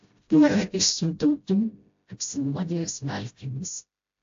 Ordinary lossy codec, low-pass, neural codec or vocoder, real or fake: AAC, 48 kbps; 7.2 kHz; codec, 16 kHz, 0.5 kbps, FreqCodec, smaller model; fake